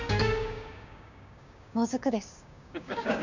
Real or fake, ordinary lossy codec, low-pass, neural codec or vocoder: fake; none; 7.2 kHz; codec, 16 kHz, 6 kbps, DAC